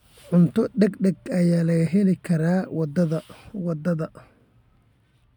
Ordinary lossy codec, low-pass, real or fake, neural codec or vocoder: none; 19.8 kHz; real; none